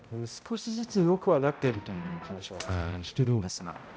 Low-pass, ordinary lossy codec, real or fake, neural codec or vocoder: none; none; fake; codec, 16 kHz, 0.5 kbps, X-Codec, HuBERT features, trained on balanced general audio